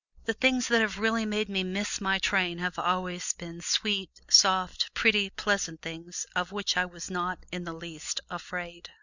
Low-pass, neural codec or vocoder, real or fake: 7.2 kHz; none; real